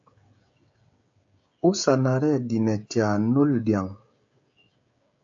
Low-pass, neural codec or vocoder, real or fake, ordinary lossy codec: 7.2 kHz; codec, 16 kHz, 16 kbps, FreqCodec, smaller model; fake; AAC, 64 kbps